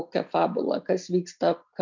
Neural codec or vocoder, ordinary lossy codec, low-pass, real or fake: none; MP3, 64 kbps; 7.2 kHz; real